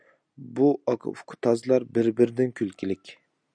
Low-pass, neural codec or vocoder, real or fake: 9.9 kHz; none; real